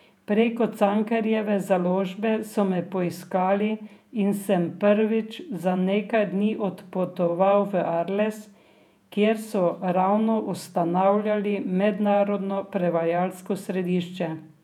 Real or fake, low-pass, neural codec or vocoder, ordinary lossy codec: fake; 19.8 kHz; vocoder, 48 kHz, 128 mel bands, Vocos; none